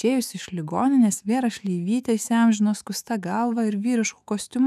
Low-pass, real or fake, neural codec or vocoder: 14.4 kHz; fake; autoencoder, 48 kHz, 128 numbers a frame, DAC-VAE, trained on Japanese speech